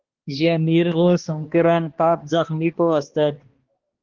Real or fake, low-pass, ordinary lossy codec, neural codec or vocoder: fake; 7.2 kHz; Opus, 16 kbps; codec, 16 kHz, 1 kbps, X-Codec, HuBERT features, trained on balanced general audio